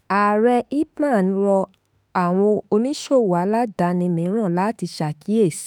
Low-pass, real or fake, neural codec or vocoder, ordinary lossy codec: none; fake; autoencoder, 48 kHz, 32 numbers a frame, DAC-VAE, trained on Japanese speech; none